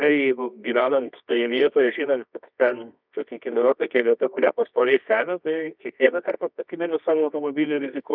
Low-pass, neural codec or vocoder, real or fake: 5.4 kHz; codec, 24 kHz, 0.9 kbps, WavTokenizer, medium music audio release; fake